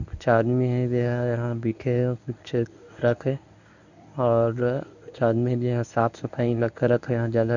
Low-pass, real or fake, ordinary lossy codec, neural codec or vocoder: 7.2 kHz; fake; none; codec, 24 kHz, 0.9 kbps, WavTokenizer, medium speech release version 1